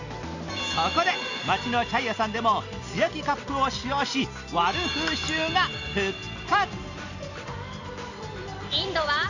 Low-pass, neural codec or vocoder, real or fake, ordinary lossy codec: 7.2 kHz; none; real; none